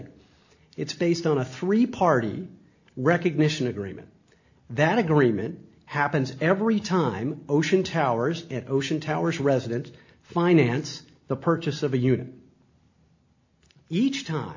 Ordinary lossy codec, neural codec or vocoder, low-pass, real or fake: AAC, 48 kbps; none; 7.2 kHz; real